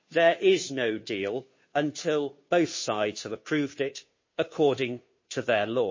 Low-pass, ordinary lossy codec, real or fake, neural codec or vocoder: 7.2 kHz; MP3, 32 kbps; fake; codec, 16 kHz, 2 kbps, FunCodec, trained on Chinese and English, 25 frames a second